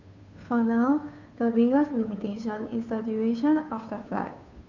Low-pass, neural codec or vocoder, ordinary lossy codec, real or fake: 7.2 kHz; codec, 16 kHz, 2 kbps, FunCodec, trained on Chinese and English, 25 frames a second; none; fake